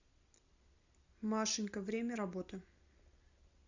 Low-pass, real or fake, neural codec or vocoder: 7.2 kHz; real; none